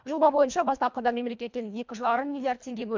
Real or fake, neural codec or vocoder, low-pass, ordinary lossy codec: fake; codec, 24 kHz, 1.5 kbps, HILCodec; 7.2 kHz; MP3, 64 kbps